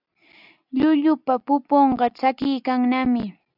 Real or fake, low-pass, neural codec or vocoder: real; 5.4 kHz; none